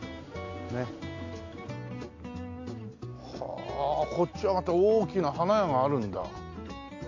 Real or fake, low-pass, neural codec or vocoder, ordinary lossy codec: real; 7.2 kHz; none; AAC, 48 kbps